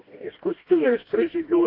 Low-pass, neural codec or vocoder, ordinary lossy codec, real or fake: 5.4 kHz; codec, 16 kHz, 1 kbps, FreqCodec, smaller model; AAC, 32 kbps; fake